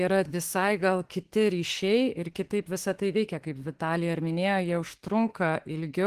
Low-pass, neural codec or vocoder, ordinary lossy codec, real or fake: 14.4 kHz; autoencoder, 48 kHz, 32 numbers a frame, DAC-VAE, trained on Japanese speech; Opus, 16 kbps; fake